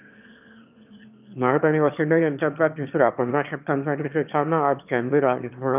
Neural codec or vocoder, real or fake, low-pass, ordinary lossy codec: autoencoder, 22.05 kHz, a latent of 192 numbers a frame, VITS, trained on one speaker; fake; 3.6 kHz; none